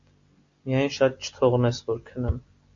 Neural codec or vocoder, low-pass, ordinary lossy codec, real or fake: none; 7.2 kHz; AAC, 48 kbps; real